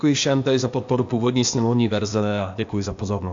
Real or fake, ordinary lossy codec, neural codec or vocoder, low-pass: fake; AAC, 64 kbps; codec, 16 kHz, 0.8 kbps, ZipCodec; 7.2 kHz